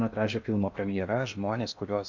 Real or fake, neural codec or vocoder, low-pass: fake; codec, 16 kHz in and 24 kHz out, 0.8 kbps, FocalCodec, streaming, 65536 codes; 7.2 kHz